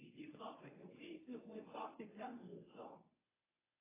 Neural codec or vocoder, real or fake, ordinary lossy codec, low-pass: codec, 24 kHz, 0.9 kbps, WavTokenizer, medium speech release version 1; fake; AAC, 16 kbps; 3.6 kHz